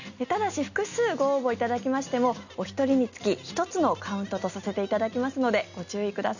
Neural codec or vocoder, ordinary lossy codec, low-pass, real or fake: none; none; 7.2 kHz; real